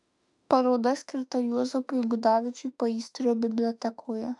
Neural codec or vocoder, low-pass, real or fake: autoencoder, 48 kHz, 32 numbers a frame, DAC-VAE, trained on Japanese speech; 10.8 kHz; fake